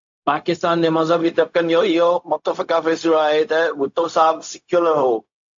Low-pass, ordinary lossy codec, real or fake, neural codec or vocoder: 7.2 kHz; AAC, 64 kbps; fake; codec, 16 kHz, 0.4 kbps, LongCat-Audio-Codec